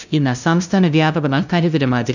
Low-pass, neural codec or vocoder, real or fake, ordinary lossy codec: 7.2 kHz; codec, 16 kHz, 0.5 kbps, FunCodec, trained on LibriTTS, 25 frames a second; fake; none